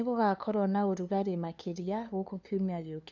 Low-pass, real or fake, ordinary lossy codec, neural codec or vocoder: 7.2 kHz; fake; none; codec, 16 kHz, 2 kbps, FunCodec, trained on LibriTTS, 25 frames a second